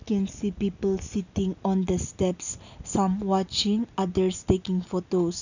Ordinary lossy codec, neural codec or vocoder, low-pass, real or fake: none; none; 7.2 kHz; real